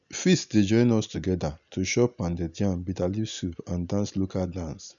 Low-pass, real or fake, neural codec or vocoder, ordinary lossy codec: 7.2 kHz; real; none; none